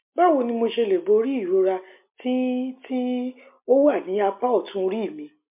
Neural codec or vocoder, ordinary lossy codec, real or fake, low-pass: none; MP3, 32 kbps; real; 3.6 kHz